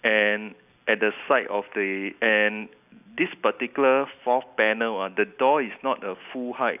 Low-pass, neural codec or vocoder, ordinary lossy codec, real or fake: 3.6 kHz; none; none; real